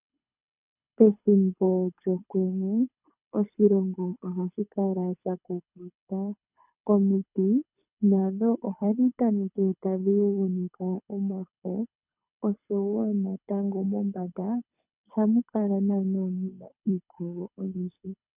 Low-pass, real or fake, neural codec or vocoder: 3.6 kHz; fake; codec, 24 kHz, 6 kbps, HILCodec